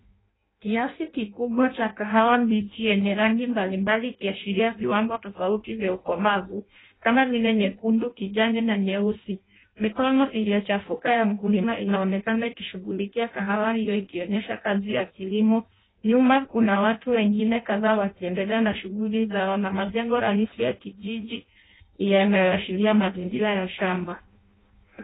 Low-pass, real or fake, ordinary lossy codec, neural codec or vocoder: 7.2 kHz; fake; AAC, 16 kbps; codec, 16 kHz in and 24 kHz out, 0.6 kbps, FireRedTTS-2 codec